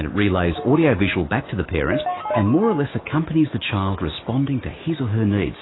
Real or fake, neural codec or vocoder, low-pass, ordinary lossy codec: fake; autoencoder, 48 kHz, 128 numbers a frame, DAC-VAE, trained on Japanese speech; 7.2 kHz; AAC, 16 kbps